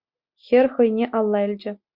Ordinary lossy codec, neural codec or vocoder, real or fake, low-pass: Opus, 64 kbps; none; real; 5.4 kHz